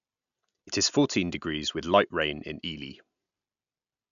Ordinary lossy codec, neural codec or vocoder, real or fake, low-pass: none; none; real; 7.2 kHz